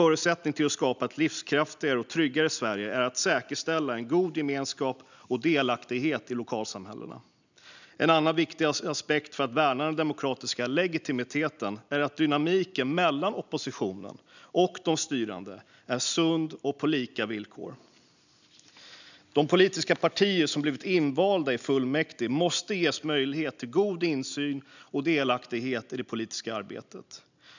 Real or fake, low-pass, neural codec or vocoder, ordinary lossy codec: real; 7.2 kHz; none; none